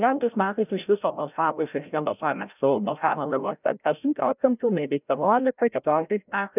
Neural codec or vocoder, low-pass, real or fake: codec, 16 kHz, 0.5 kbps, FreqCodec, larger model; 3.6 kHz; fake